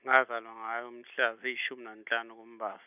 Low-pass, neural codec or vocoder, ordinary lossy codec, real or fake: 3.6 kHz; none; none; real